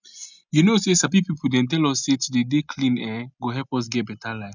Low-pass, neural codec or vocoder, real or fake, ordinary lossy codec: 7.2 kHz; none; real; none